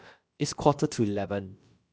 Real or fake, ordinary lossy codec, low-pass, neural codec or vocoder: fake; none; none; codec, 16 kHz, about 1 kbps, DyCAST, with the encoder's durations